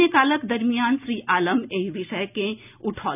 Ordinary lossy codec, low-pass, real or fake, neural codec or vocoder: none; 3.6 kHz; real; none